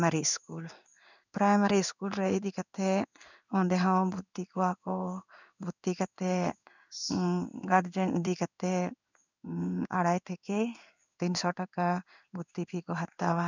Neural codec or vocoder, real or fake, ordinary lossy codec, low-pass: codec, 16 kHz in and 24 kHz out, 1 kbps, XY-Tokenizer; fake; none; 7.2 kHz